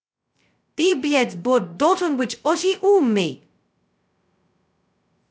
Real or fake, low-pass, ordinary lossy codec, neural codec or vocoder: fake; none; none; codec, 16 kHz, 0.2 kbps, FocalCodec